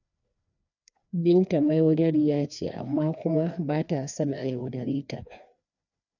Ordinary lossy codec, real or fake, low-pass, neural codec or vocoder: none; fake; 7.2 kHz; codec, 16 kHz, 2 kbps, FreqCodec, larger model